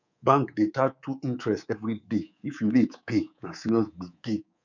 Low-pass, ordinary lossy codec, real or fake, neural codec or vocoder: 7.2 kHz; none; fake; codec, 24 kHz, 3.1 kbps, DualCodec